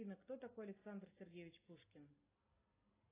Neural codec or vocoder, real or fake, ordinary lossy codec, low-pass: none; real; AAC, 16 kbps; 3.6 kHz